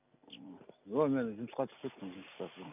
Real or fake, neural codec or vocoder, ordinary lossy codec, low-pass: real; none; none; 3.6 kHz